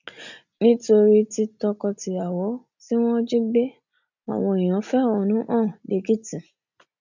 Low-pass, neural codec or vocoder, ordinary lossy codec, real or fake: 7.2 kHz; none; none; real